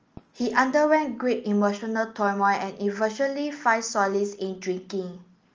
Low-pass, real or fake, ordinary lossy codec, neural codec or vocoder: 7.2 kHz; real; Opus, 24 kbps; none